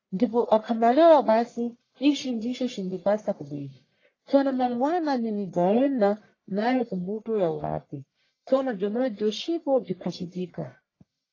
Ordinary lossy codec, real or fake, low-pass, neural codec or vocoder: AAC, 32 kbps; fake; 7.2 kHz; codec, 44.1 kHz, 1.7 kbps, Pupu-Codec